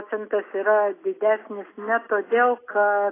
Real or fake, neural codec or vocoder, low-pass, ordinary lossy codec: real; none; 3.6 kHz; AAC, 16 kbps